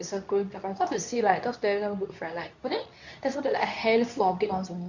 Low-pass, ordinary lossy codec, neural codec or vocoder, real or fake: 7.2 kHz; none; codec, 24 kHz, 0.9 kbps, WavTokenizer, medium speech release version 2; fake